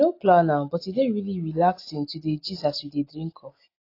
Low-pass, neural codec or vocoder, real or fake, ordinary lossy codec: 5.4 kHz; none; real; AAC, 32 kbps